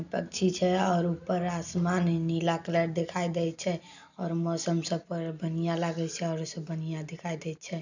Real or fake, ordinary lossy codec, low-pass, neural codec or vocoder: real; none; 7.2 kHz; none